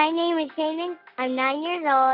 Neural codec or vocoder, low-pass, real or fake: none; 5.4 kHz; real